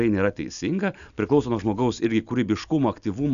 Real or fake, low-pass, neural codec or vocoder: real; 7.2 kHz; none